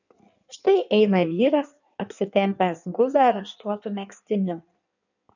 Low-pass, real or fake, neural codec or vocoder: 7.2 kHz; fake; codec, 16 kHz in and 24 kHz out, 1.1 kbps, FireRedTTS-2 codec